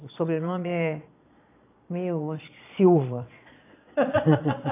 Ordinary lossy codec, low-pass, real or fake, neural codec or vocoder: AAC, 24 kbps; 3.6 kHz; fake; vocoder, 22.05 kHz, 80 mel bands, Vocos